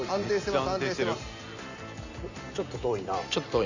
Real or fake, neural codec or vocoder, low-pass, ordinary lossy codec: real; none; 7.2 kHz; none